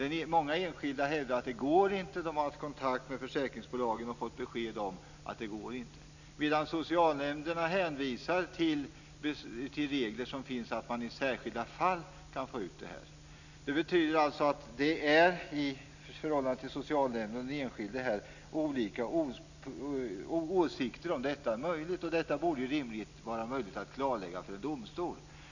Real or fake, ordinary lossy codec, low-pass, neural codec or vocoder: real; none; 7.2 kHz; none